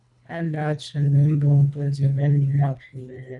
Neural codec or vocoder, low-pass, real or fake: codec, 24 kHz, 1.5 kbps, HILCodec; 10.8 kHz; fake